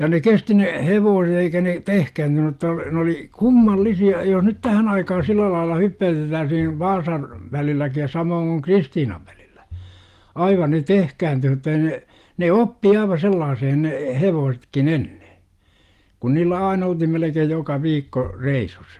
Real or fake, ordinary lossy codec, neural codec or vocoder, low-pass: real; Opus, 24 kbps; none; 14.4 kHz